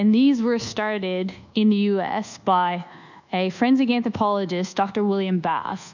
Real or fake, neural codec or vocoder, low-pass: fake; codec, 24 kHz, 1.2 kbps, DualCodec; 7.2 kHz